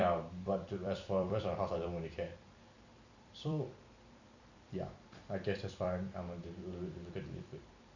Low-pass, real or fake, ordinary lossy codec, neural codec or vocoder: 7.2 kHz; fake; none; vocoder, 44.1 kHz, 128 mel bands every 256 samples, BigVGAN v2